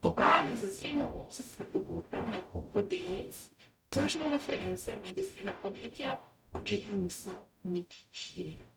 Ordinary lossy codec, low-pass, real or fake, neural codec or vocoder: none; 19.8 kHz; fake; codec, 44.1 kHz, 0.9 kbps, DAC